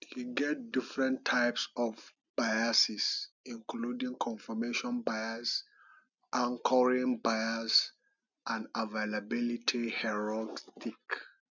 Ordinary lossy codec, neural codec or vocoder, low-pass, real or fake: none; none; 7.2 kHz; real